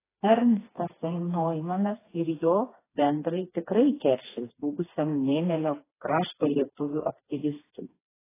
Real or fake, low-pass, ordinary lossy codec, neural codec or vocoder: fake; 3.6 kHz; AAC, 16 kbps; codec, 16 kHz, 2 kbps, FreqCodec, smaller model